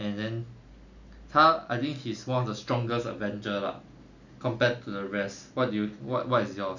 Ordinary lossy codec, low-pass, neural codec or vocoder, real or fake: none; 7.2 kHz; none; real